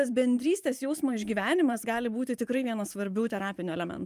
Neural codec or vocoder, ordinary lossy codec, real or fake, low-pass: vocoder, 44.1 kHz, 128 mel bands every 512 samples, BigVGAN v2; Opus, 32 kbps; fake; 14.4 kHz